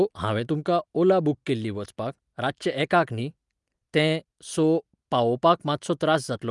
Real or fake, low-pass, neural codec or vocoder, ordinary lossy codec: real; 10.8 kHz; none; Opus, 32 kbps